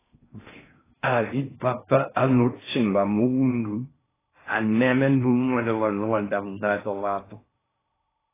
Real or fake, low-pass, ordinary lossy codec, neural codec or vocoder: fake; 3.6 kHz; AAC, 16 kbps; codec, 16 kHz in and 24 kHz out, 0.6 kbps, FocalCodec, streaming, 4096 codes